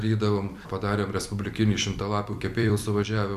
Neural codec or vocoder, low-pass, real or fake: vocoder, 44.1 kHz, 128 mel bands every 256 samples, BigVGAN v2; 14.4 kHz; fake